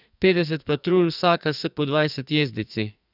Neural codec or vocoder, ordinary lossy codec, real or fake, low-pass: codec, 44.1 kHz, 2.6 kbps, SNAC; none; fake; 5.4 kHz